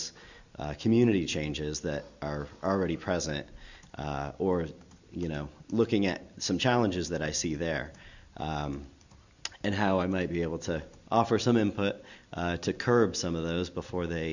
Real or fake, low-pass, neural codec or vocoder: real; 7.2 kHz; none